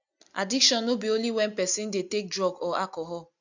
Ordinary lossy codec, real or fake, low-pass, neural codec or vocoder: none; real; 7.2 kHz; none